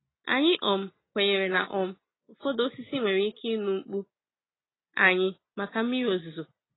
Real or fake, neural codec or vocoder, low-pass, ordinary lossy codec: real; none; 7.2 kHz; AAC, 16 kbps